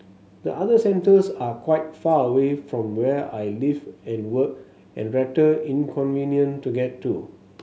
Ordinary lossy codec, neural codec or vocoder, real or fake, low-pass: none; none; real; none